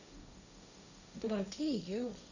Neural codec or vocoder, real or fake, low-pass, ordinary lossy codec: codec, 16 kHz, 1.1 kbps, Voila-Tokenizer; fake; 7.2 kHz; none